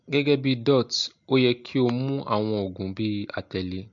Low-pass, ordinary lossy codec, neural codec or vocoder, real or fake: 7.2 kHz; MP3, 48 kbps; none; real